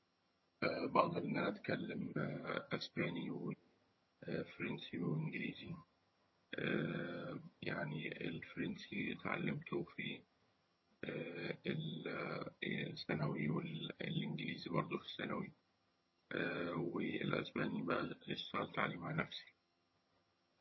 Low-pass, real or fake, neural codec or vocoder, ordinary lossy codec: 7.2 kHz; fake; vocoder, 22.05 kHz, 80 mel bands, HiFi-GAN; MP3, 24 kbps